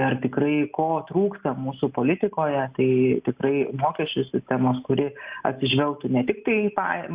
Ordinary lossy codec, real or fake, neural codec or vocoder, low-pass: Opus, 64 kbps; real; none; 3.6 kHz